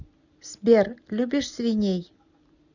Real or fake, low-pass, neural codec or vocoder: real; 7.2 kHz; none